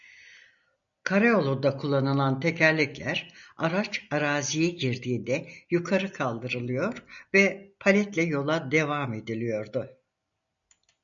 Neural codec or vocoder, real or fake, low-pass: none; real; 7.2 kHz